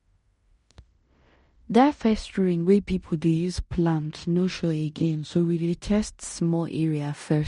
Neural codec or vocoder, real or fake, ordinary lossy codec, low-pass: codec, 16 kHz in and 24 kHz out, 0.9 kbps, LongCat-Audio-Codec, fine tuned four codebook decoder; fake; MP3, 48 kbps; 10.8 kHz